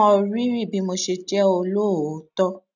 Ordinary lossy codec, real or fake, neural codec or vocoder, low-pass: none; real; none; 7.2 kHz